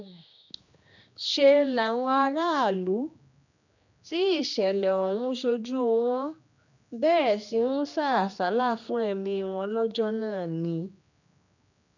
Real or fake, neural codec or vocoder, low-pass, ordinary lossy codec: fake; codec, 16 kHz, 2 kbps, X-Codec, HuBERT features, trained on general audio; 7.2 kHz; none